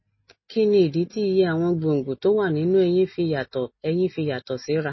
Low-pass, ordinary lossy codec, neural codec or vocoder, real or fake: 7.2 kHz; MP3, 24 kbps; none; real